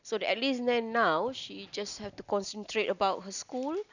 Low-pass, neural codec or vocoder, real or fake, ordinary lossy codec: 7.2 kHz; none; real; none